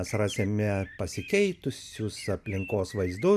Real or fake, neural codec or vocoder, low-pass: real; none; 14.4 kHz